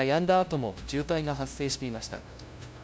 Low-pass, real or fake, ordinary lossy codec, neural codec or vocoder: none; fake; none; codec, 16 kHz, 0.5 kbps, FunCodec, trained on LibriTTS, 25 frames a second